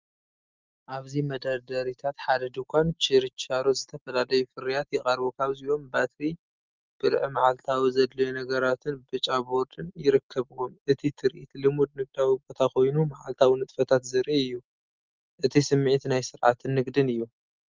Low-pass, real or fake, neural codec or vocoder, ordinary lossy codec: 7.2 kHz; real; none; Opus, 32 kbps